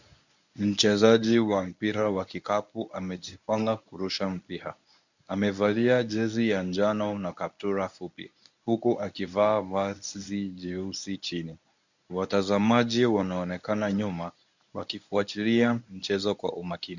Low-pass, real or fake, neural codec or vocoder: 7.2 kHz; fake; codec, 24 kHz, 0.9 kbps, WavTokenizer, medium speech release version 1